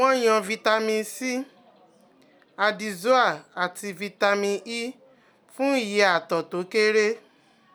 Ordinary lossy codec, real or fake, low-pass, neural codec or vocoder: none; real; none; none